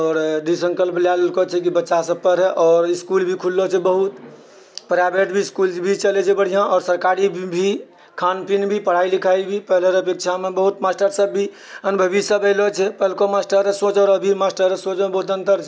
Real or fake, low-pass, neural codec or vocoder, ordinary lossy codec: real; none; none; none